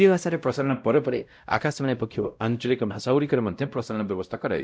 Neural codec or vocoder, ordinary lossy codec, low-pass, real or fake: codec, 16 kHz, 0.5 kbps, X-Codec, WavLM features, trained on Multilingual LibriSpeech; none; none; fake